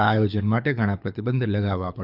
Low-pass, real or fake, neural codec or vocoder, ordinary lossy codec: 5.4 kHz; fake; codec, 24 kHz, 6 kbps, HILCodec; none